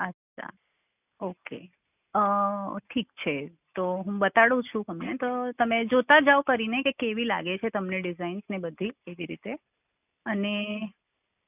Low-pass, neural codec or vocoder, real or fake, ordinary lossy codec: 3.6 kHz; none; real; none